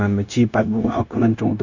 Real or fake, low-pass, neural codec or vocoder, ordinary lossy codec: fake; 7.2 kHz; codec, 16 kHz, 0.9 kbps, LongCat-Audio-Codec; none